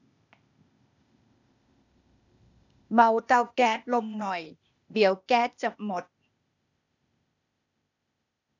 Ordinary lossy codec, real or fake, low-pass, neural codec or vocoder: none; fake; 7.2 kHz; codec, 16 kHz, 0.8 kbps, ZipCodec